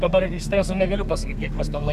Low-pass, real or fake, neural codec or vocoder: 14.4 kHz; fake; codec, 32 kHz, 1.9 kbps, SNAC